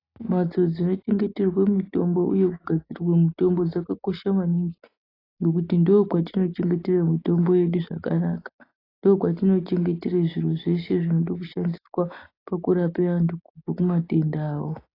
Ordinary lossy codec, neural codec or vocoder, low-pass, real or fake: AAC, 24 kbps; none; 5.4 kHz; real